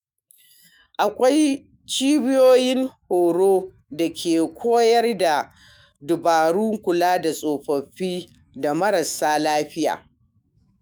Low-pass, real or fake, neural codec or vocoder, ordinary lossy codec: none; fake; autoencoder, 48 kHz, 128 numbers a frame, DAC-VAE, trained on Japanese speech; none